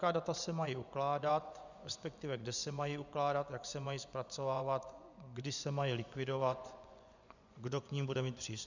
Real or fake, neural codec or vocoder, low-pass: fake; vocoder, 24 kHz, 100 mel bands, Vocos; 7.2 kHz